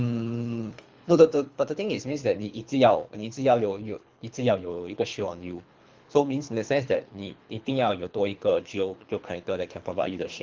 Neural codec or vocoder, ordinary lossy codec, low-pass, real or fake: codec, 24 kHz, 3 kbps, HILCodec; Opus, 32 kbps; 7.2 kHz; fake